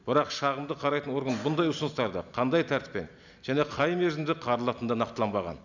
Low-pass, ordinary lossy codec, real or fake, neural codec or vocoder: 7.2 kHz; none; real; none